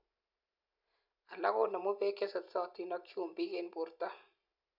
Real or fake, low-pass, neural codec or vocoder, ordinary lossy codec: real; 5.4 kHz; none; none